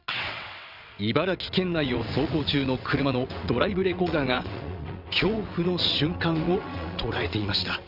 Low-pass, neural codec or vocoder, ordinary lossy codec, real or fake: 5.4 kHz; vocoder, 22.05 kHz, 80 mel bands, WaveNeXt; none; fake